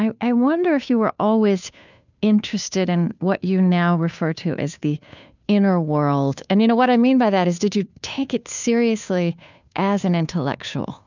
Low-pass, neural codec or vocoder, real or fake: 7.2 kHz; codec, 16 kHz, 2 kbps, FunCodec, trained on Chinese and English, 25 frames a second; fake